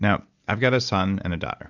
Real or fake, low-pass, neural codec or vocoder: real; 7.2 kHz; none